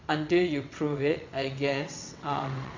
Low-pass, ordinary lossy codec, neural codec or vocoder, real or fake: 7.2 kHz; MP3, 48 kbps; vocoder, 22.05 kHz, 80 mel bands, WaveNeXt; fake